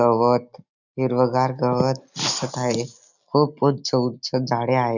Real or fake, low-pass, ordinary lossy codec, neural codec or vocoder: real; 7.2 kHz; none; none